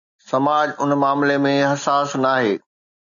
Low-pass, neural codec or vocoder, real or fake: 7.2 kHz; none; real